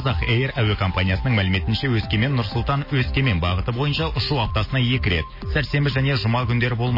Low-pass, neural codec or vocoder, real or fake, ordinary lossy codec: 5.4 kHz; none; real; MP3, 24 kbps